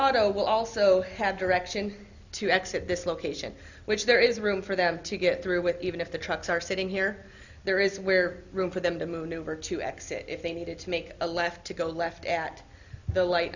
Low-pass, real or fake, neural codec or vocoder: 7.2 kHz; real; none